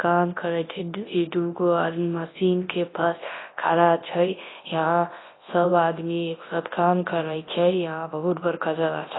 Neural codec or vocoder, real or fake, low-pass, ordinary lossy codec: codec, 24 kHz, 0.9 kbps, WavTokenizer, large speech release; fake; 7.2 kHz; AAC, 16 kbps